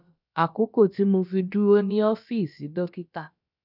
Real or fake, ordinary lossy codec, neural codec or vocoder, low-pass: fake; none; codec, 16 kHz, about 1 kbps, DyCAST, with the encoder's durations; 5.4 kHz